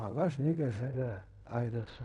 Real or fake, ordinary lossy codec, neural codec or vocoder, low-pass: fake; none; codec, 16 kHz in and 24 kHz out, 0.4 kbps, LongCat-Audio-Codec, fine tuned four codebook decoder; 10.8 kHz